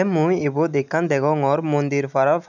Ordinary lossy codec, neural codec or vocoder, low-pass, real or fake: none; none; 7.2 kHz; real